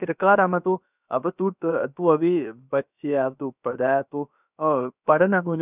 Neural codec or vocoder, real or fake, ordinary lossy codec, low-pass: codec, 16 kHz, about 1 kbps, DyCAST, with the encoder's durations; fake; none; 3.6 kHz